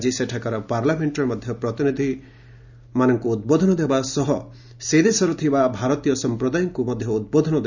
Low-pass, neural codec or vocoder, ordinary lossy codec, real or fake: 7.2 kHz; none; none; real